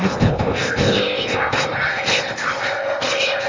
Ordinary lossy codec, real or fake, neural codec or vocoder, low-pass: Opus, 32 kbps; fake; codec, 16 kHz in and 24 kHz out, 0.8 kbps, FocalCodec, streaming, 65536 codes; 7.2 kHz